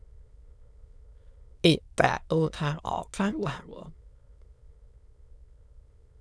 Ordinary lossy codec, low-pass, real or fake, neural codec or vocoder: none; none; fake; autoencoder, 22.05 kHz, a latent of 192 numbers a frame, VITS, trained on many speakers